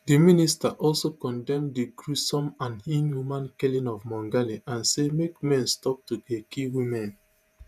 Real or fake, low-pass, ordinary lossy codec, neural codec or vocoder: fake; 14.4 kHz; none; vocoder, 48 kHz, 128 mel bands, Vocos